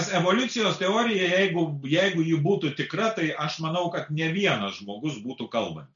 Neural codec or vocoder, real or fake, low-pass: none; real; 7.2 kHz